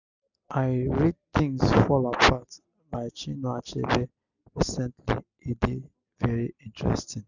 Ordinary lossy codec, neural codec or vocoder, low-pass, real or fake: none; none; 7.2 kHz; real